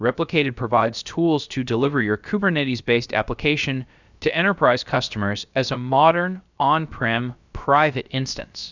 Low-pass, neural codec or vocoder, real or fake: 7.2 kHz; codec, 16 kHz, about 1 kbps, DyCAST, with the encoder's durations; fake